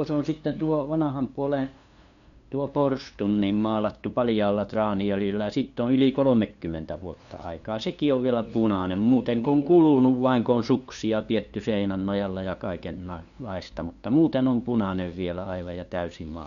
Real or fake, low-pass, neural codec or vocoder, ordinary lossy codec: fake; 7.2 kHz; codec, 16 kHz, 2 kbps, FunCodec, trained on LibriTTS, 25 frames a second; none